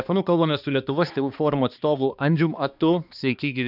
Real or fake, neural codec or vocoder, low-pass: fake; codec, 16 kHz, 2 kbps, X-Codec, HuBERT features, trained on balanced general audio; 5.4 kHz